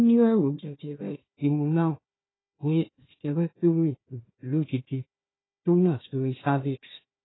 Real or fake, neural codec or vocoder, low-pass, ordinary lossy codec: fake; codec, 16 kHz, 1 kbps, FunCodec, trained on Chinese and English, 50 frames a second; 7.2 kHz; AAC, 16 kbps